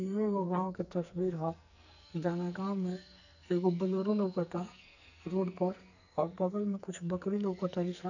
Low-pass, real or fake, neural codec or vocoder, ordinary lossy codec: 7.2 kHz; fake; codec, 44.1 kHz, 2.6 kbps, SNAC; none